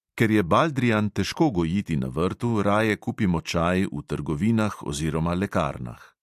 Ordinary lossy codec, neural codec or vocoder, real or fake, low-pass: MP3, 64 kbps; none; real; 14.4 kHz